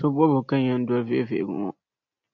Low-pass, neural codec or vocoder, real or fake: 7.2 kHz; none; real